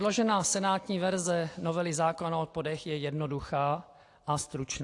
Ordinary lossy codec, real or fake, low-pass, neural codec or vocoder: AAC, 48 kbps; real; 10.8 kHz; none